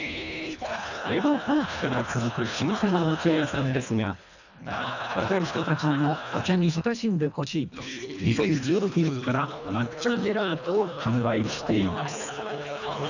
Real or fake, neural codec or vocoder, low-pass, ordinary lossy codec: fake; codec, 24 kHz, 1.5 kbps, HILCodec; 7.2 kHz; none